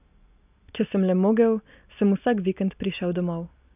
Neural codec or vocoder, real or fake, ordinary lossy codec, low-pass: none; real; none; 3.6 kHz